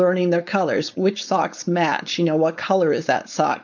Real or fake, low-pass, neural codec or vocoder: fake; 7.2 kHz; codec, 16 kHz, 4.8 kbps, FACodec